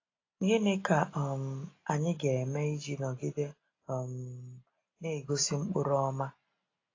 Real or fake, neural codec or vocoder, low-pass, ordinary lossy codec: real; none; 7.2 kHz; AAC, 32 kbps